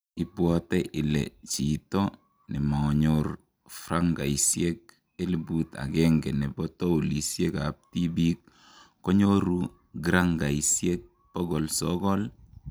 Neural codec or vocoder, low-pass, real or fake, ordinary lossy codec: none; none; real; none